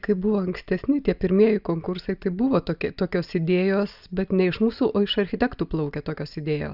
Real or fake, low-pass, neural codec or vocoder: real; 5.4 kHz; none